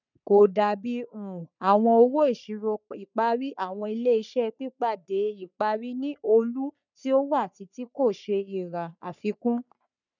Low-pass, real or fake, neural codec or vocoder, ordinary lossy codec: 7.2 kHz; fake; codec, 44.1 kHz, 3.4 kbps, Pupu-Codec; none